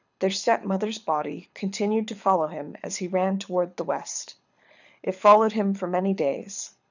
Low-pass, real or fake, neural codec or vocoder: 7.2 kHz; fake; codec, 24 kHz, 6 kbps, HILCodec